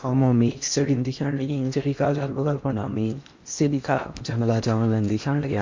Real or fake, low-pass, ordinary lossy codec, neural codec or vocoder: fake; 7.2 kHz; MP3, 48 kbps; codec, 16 kHz in and 24 kHz out, 0.8 kbps, FocalCodec, streaming, 65536 codes